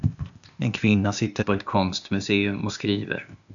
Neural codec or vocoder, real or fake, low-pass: codec, 16 kHz, 0.8 kbps, ZipCodec; fake; 7.2 kHz